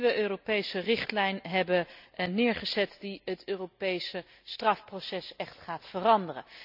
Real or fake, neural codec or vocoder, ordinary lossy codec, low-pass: real; none; none; 5.4 kHz